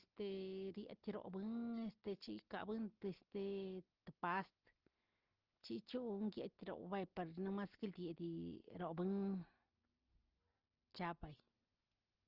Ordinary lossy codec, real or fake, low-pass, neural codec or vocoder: Opus, 16 kbps; real; 5.4 kHz; none